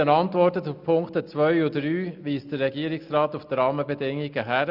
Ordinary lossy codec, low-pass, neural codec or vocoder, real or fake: none; 5.4 kHz; none; real